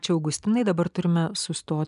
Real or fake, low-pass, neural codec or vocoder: real; 10.8 kHz; none